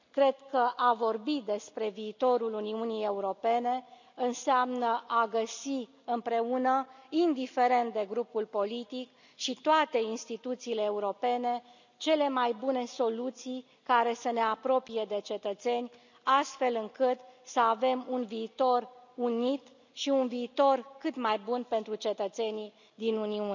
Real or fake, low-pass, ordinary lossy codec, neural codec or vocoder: real; 7.2 kHz; none; none